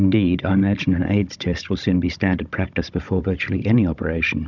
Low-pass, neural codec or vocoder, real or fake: 7.2 kHz; codec, 16 kHz, 16 kbps, FunCodec, trained on Chinese and English, 50 frames a second; fake